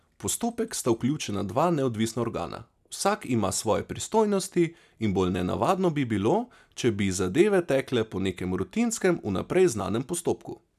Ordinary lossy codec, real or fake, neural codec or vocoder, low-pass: none; real; none; 14.4 kHz